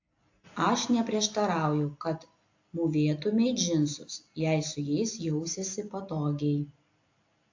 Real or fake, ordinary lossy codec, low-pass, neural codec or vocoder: real; AAC, 48 kbps; 7.2 kHz; none